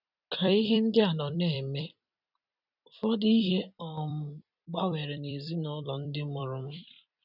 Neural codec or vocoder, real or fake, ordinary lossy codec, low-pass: vocoder, 44.1 kHz, 128 mel bands every 256 samples, BigVGAN v2; fake; none; 5.4 kHz